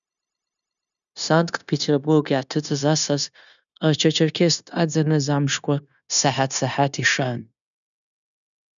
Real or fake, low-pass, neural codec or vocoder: fake; 7.2 kHz; codec, 16 kHz, 0.9 kbps, LongCat-Audio-Codec